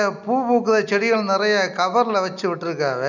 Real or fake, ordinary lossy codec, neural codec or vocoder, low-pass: real; none; none; 7.2 kHz